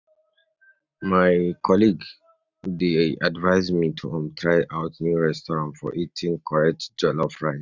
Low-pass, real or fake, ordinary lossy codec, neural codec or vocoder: 7.2 kHz; real; none; none